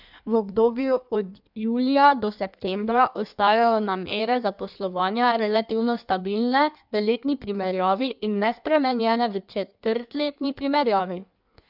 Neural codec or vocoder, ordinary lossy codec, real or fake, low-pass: codec, 16 kHz in and 24 kHz out, 1.1 kbps, FireRedTTS-2 codec; none; fake; 5.4 kHz